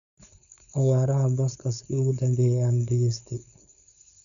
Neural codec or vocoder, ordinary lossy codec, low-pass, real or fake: codec, 16 kHz, 4.8 kbps, FACodec; none; 7.2 kHz; fake